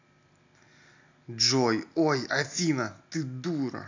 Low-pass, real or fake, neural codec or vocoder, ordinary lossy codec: 7.2 kHz; real; none; AAC, 48 kbps